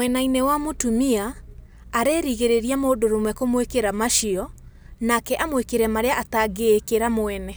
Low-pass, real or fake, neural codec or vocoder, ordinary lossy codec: none; real; none; none